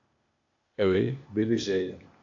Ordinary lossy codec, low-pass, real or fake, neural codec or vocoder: AAC, 48 kbps; 7.2 kHz; fake; codec, 16 kHz, 0.8 kbps, ZipCodec